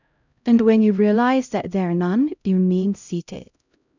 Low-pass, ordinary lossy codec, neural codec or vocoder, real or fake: 7.2 kHz; none; codec, 16 kHz, 0.5 kbps, X-Codec, HuBERT features, trained on LibriSpeech; fake